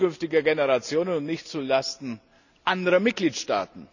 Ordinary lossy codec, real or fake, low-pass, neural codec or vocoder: none; real; 7.2 kHz; none